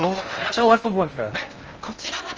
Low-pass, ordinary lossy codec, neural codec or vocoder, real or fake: 7.2 kHz; Opus, 24 kbps; codec, 16 kHz in and 24 kHz out, 0.6 kbps, FocalCodec, streaming, 2048 codes; fake